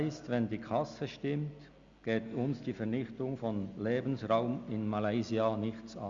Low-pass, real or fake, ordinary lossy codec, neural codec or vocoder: 7.2 kHz; real; Opus, 64 kbps; none